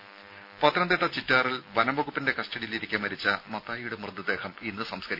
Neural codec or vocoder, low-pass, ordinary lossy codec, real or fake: none; 5.4 kHz; none; real